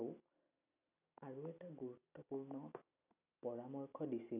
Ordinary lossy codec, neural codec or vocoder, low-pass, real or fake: none; none; 3.6 kHz; real